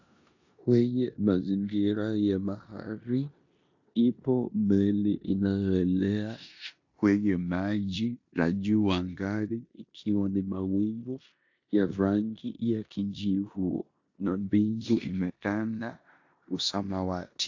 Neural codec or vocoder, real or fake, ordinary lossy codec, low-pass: codec, 16 kHz in and 24 kHz out, 0.9 kbps, LongCat-Audio-Codec, four codebook decoder; fake; AAC, 48 kbps; 7.2 kHz